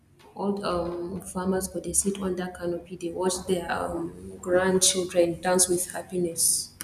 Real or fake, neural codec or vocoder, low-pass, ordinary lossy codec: real; none; 14.4 kHz; none